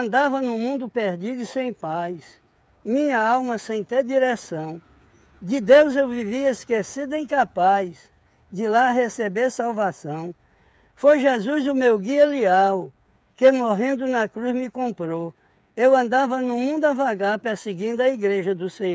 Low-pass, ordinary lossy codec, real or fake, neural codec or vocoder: none; none; fake; codec, 16 kHz, 8 kbps, FreqCodec, smaller model